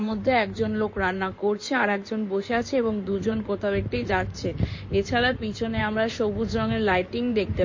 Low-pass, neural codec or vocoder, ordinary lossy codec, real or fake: 7.2 kHz; codec, 16 kHz in and 24 kHz out, 2.2 kbps, FireRedTTS-2 codec; MP3, 32 kbps; fake